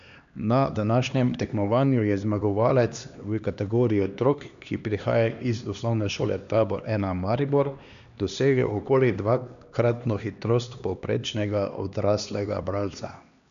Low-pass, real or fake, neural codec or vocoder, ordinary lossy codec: 7.2 kHz; fake; codec, 16 kHz, 2 kbps, X-Codec, HuBERT features, trained on LibriSpeech; none